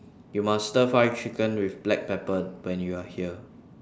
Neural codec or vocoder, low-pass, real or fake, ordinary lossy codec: none; none; real; none